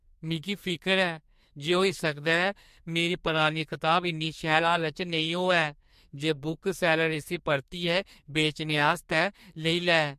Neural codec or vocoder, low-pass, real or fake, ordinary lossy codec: codec, 44.1 kHz, 2.6 kbps, SNAC; 14.4 kHz; fake; MP3, 64 kbps